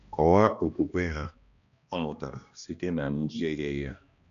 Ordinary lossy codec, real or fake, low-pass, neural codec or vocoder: none; fake; 7.2 kHz; codec, 16 kHz, 1 kbps, X-Codec, HuBERT features, trained on balanced general audio